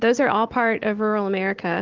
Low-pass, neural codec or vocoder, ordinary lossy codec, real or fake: 7.2 kHz; none; Opus, 16 kbps; real